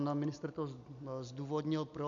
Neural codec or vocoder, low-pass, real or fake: none; 7.2 kHz; real